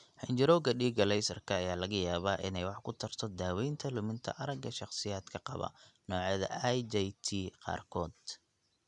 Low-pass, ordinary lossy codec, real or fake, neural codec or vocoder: 10.8 kHz; none; real; none